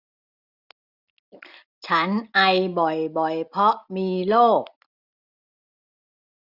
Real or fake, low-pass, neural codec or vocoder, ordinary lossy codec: real; 5.4 kHz; none; none